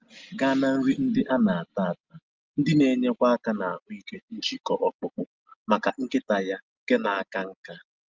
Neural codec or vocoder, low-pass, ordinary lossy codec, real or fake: none; 7.2 kHz; Opus, 24 kbps; real